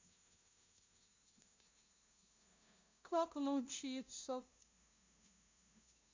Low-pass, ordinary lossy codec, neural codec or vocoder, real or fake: 7.2 kHz; MP3, 64 kbps; codec, 16 kHz, 0.5 kbps, FunCodec, trained on LibriTTS, 25 frames a second; fake